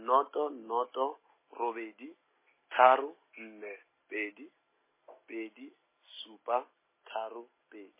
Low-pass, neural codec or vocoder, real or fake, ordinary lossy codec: 3.6 kHz; none; real; MP3, 16 kbps